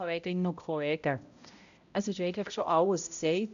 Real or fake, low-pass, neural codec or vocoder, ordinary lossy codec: fake; 7.2 kHz; codec, 16 kHz, 0.5 kbps, X-Codec, HuBERT features, trained on balanced general audio; none